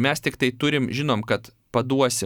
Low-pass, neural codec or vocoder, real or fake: 19.8 kHz; none; real